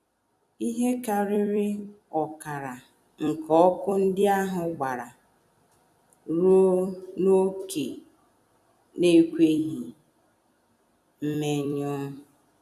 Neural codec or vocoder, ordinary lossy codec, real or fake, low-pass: vocoder, 44.1 kHz, 128 mel bands every 256 samples, BigVGAN v2; none; fake; 14.4 kHz